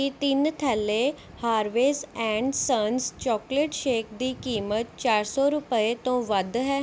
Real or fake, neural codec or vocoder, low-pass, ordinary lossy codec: real; none; none; none